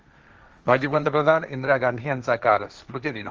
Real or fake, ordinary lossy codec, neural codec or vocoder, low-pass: fake; Opus, 24 kbps; codec, 16 kHz, 1.1 kbps, Voila-Tokenizer; 7.2 kHz